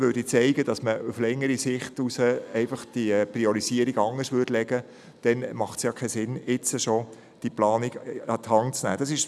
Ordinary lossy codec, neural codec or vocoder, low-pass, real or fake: none; none; none; real